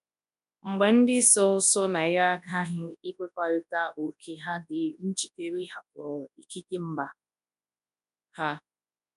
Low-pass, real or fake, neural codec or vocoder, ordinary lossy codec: 10.8 kHz; fake; codec, 24 kHz, 0.9 kbps, WavTokenizer, large speech release; none